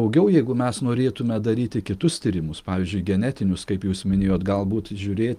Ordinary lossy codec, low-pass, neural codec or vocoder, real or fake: Opus, 32 kbps; 14.4 kHz; none; real